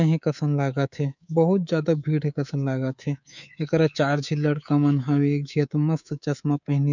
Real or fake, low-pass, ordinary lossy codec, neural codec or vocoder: fake; 7.2 kHz; none; codec, 24 kHz, 3.1 kbps, DualCodec